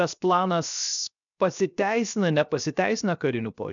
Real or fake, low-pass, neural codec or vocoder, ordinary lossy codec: fake; 7.2 kHz; codec, 16 kHz, 0.7 kbps, FocalCodec; MP3, 96 kbps